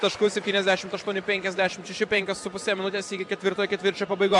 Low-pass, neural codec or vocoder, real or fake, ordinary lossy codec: 10.8 kHz; vocoder, 44.1 kHz, 128 mel bands every 512 samples, BigVGAN v2; fake; MP3, 64 kbps